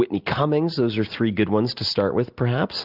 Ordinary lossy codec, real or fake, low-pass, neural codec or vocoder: Opus, 32 kbps; real; 5.4 kHz; none